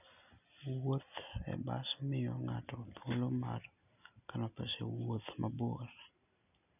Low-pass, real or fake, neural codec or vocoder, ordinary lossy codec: 3.6 kHz; real; none; none